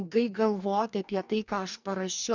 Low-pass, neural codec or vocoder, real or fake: 7.2 kHz; codec, 44.1 kHz, 2.6 kbps, DAC; fake